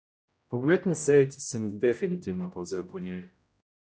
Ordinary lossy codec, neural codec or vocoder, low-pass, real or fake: none; codec, 16 kHz, 0.5 kbps, X-Codec, HuBERT features, trained on general audio; none; fake